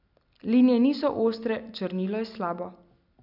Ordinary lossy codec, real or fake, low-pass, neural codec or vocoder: none; real; 5.4 kHz; none